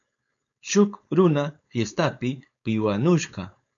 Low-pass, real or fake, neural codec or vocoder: 7.2 kHz; fake; codec, 16 kHz, 4.8 kbps, FACodec